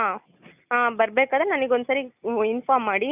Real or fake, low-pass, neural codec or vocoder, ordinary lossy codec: real; 3.6 kHz; none; none